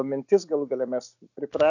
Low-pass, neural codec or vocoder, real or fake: 7.2 kHz; none; real